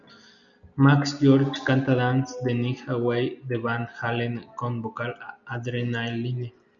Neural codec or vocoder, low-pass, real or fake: none; 7.2 kHz; real